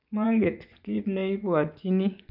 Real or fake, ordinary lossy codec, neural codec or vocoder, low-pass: fake; none; vocoder, 24 kHz, 100 mel bands, Vocos; 5.4 kHz